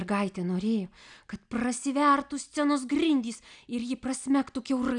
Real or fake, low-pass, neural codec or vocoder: real; 9.9 kHz; none